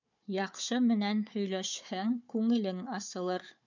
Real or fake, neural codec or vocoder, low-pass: fake; codec, 16 kHz, 4 kbps, FunCodec, trained on Chinese and English, 50 frames a second; 7.2 kHz